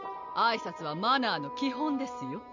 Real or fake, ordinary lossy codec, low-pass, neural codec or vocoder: real; none; 7.2 kHz; none